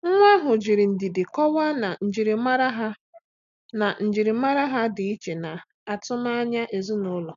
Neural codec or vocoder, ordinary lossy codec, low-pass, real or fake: none; none; 7.2 kHz; real